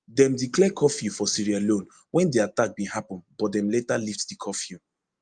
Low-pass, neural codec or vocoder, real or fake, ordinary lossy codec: 9.9 kHz; none; real; Opus, 32 kbps